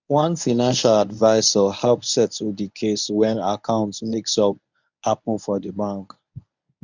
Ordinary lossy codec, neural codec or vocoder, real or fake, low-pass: none; codec, 24 kHz, 0.9 kbps, WavTokenizer, medium speech release version 1; fake; 7.2 kHz